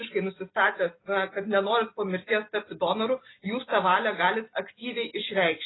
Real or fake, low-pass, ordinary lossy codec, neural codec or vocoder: real; 7.2 kHz; AAC, 16 kbps; none